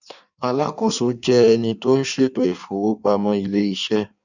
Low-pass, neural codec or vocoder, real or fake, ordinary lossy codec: 7.2 kHz; codec, 16 kHz in and 24 kHz out, 1.1 kbps, FireRedTTS-2 codec; fake; none